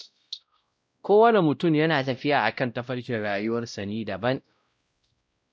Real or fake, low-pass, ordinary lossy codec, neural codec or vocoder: fake; none; none; codec, 16 kHz, 0.5 kbps, X-Codec, WavLM features, trained on Multilingual LibriSpeech